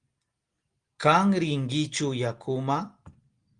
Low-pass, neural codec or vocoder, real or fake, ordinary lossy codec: 9.9 kHz; none; real; Opus, 24 kbps